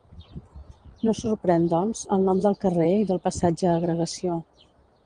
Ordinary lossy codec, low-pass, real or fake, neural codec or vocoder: Opus, 24 kbps; 9.9 kHz; fake; vocoder, 22.05 kHz, 80 mel bands, Vocos